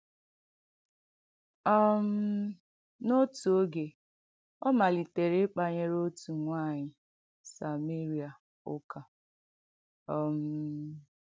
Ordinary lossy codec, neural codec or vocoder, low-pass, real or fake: none; none; none; real